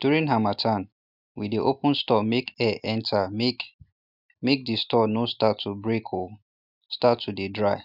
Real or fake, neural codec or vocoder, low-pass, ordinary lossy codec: real; none; 5.4 kHz; none